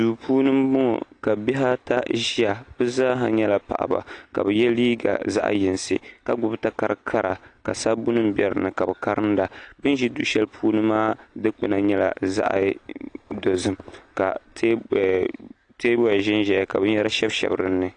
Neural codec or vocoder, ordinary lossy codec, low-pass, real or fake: none; AAC, 48 kbps; 9.9 kHz; real